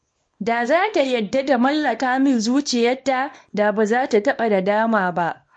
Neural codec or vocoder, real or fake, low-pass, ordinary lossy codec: codec, 24 kHz, 0.9 kbps, WavTokenizer, small release; fake; 9.9 kHz; MP3, 48 kbps